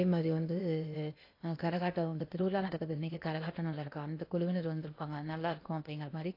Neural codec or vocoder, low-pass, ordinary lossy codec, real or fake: codec, 16 kHz in and 24 kHz out, 0.8 kbps, FocalCodec, streaming, 65536 codes; 5.4 kHz; AAC, 32 kbps; fake